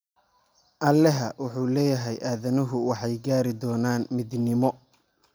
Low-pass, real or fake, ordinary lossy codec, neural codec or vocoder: none; real; none; none